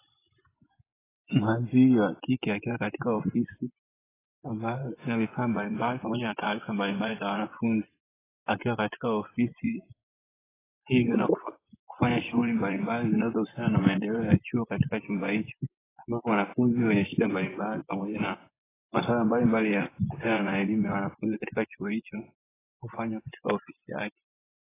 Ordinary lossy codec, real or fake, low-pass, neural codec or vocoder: AAC, 16 kbps; fake; 3.6 kHz; vocoder, 24 kHz, 100 mel bands, Vocos